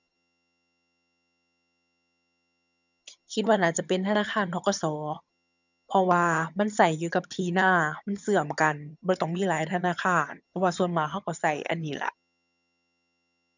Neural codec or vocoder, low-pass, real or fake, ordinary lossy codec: vocoder, 22.05 kHz, 80 mel bands, HiFi-GAN; 7.2 kHz; fake; none